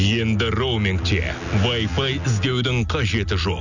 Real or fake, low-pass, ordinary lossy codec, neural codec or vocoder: real; 7.2 kHz; none; none